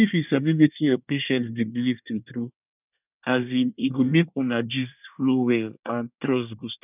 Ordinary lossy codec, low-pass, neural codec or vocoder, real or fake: none; 3.6 kHz; codec, 24 kHz, 1 kbps, SNAC; fake